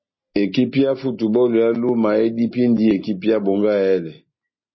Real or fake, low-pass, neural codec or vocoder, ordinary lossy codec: real; 7.2 kHz; none; MP3, 24 kbps